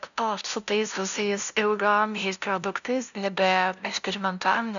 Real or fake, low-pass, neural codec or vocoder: fake; 7.2 kHz; codec, 16 kHz, 0.5 kbps, FunCodec, trained on LibriTTS, 25 frames a second